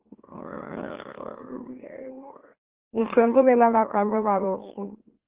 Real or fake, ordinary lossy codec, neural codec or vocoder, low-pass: fake; Opus, 16 kbps; autoencoder, 44.1 kHz, a latent of 192 numbers a frame, MeloTTS; 3.6 kHz